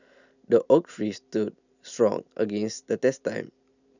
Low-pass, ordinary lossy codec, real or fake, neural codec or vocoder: 7.2 kHz; none; real; none